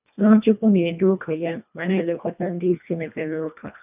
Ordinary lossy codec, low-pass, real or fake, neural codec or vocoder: none; 3.6 kHz; fake; codec, 24 kHz, 1.5 kbps, HILCodec